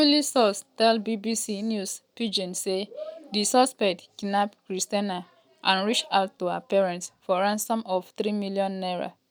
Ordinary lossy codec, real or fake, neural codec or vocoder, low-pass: none; real; none; none